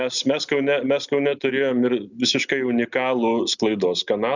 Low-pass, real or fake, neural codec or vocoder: 7.2 kHz; real; none